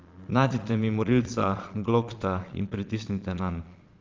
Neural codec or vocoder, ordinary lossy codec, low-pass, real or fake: vocoder, 22.05 kHz, 80 mel bands, Vocos; Opus, 32 kbps; 7.2 kHz; fake